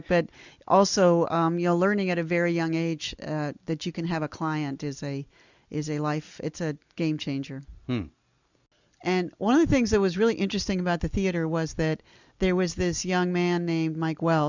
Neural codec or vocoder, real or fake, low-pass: none; real; 7.2 kHz